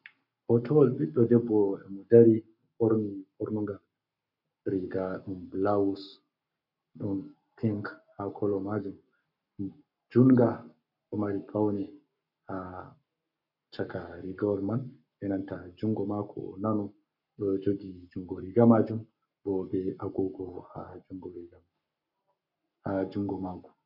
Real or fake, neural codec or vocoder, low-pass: fake; codec, 44.1 kHz, 7.8 kbps, Pupu-Codec; 5.4 kHz